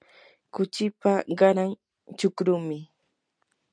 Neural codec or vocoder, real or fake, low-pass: none; real; 9.9 kHz